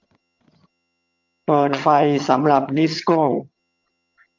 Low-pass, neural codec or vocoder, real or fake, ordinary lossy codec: 7.2 kHz; vocoder, 22.05 kHz, 80 mel bands, HiFi-GAN; fake; MP3, 48 kbps